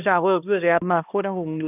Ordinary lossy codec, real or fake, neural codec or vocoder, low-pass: none; fake; codec, 16 kHz, 1 kbps, X-Codec, HuBERT features, trained on balanced general audio; 3.6 kHz